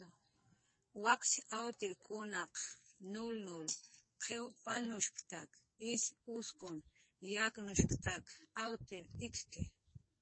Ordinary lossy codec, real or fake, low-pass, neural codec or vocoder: MP3, 32 kbps; fake; 9.9 kHz; codec, 44.1 kHz, 2.6 kbps, SNAC